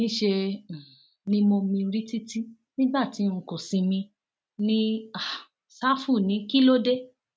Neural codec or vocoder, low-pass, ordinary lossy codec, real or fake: none; none; none; real